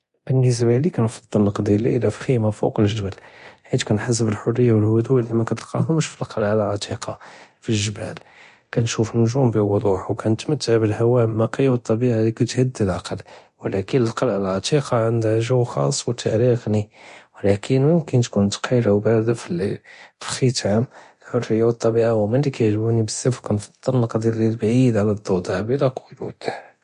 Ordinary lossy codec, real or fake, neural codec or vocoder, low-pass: MP3, 48 kbps; fake; codec, 24 kHz, 0.9 kbps, DualCodec; 10.8 kHz